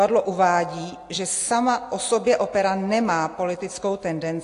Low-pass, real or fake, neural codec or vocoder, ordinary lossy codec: 10.8 kHz; real; none; AAC, 48 kbps